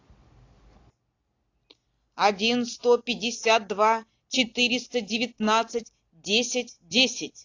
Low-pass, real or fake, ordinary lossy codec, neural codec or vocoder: 7.2 kHz; real; AAC, 48 kbps; none